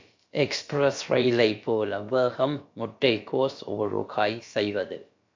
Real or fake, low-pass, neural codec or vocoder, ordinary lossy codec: fake; 7.2 kHz; codec, 16 kHz, about 1 kbps, DyCAST, with the encoder's durations; MP3, 48 kbps